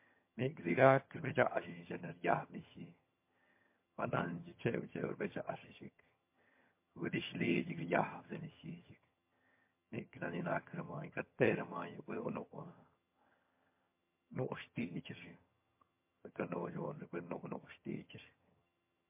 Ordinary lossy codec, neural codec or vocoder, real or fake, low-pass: MP3, 24 kbps; vocoder, 22.05 kHz, 80 mel bands, HiFi-GAN; fake; 3.6 kHz